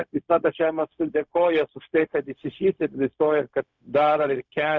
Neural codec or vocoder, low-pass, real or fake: codec, 16 kHz, 0.4 kbps, LongCat-Audio-Codec; 7.2 kHz; fake